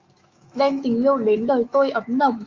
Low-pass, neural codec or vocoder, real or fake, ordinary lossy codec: 7.2 kHz; autoencoder, 48 kHz, 128 numbers a frame, DAC-VAE, trained on Japanese speech; fake; Opus, 32 kbps